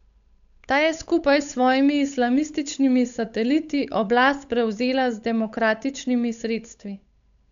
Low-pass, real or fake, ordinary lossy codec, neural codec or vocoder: 7.2 kHz; fake; none; codec, 16 kHz, 8 kbps, FunCodec, trained on Chinese and English, 25 frames a second